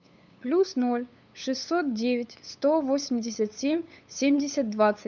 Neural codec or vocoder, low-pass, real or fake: codec, 16 kHz, 8 kbps, FunCodec, trained on LibriTTS, 25 frames a second; 7.2 kHz; fake